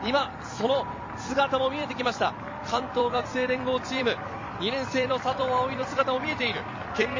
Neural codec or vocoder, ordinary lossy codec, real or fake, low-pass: vocoder, 44.1 kHz, 128 mel bands every 256 samples, BigVGAN v2; MP3, 32 kbps; fake; 7.2 kHz